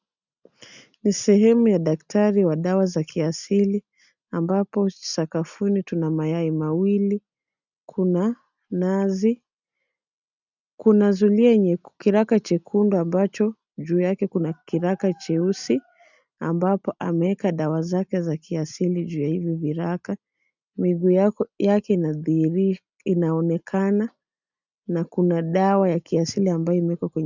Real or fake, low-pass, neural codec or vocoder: real; 7.2 kHz; none